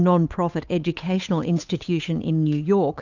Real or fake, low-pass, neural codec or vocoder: fake; 7.2 kHz; codec, 16 kHz, 8 kbps, FunCodec, trained on Chinese and English, 25 frames a second